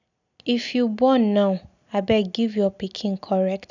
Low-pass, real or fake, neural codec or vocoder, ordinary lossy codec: 7.2 kHz; real; none; none